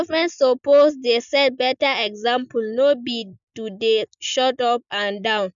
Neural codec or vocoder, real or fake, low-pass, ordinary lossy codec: none; real; 7.2 kHz; none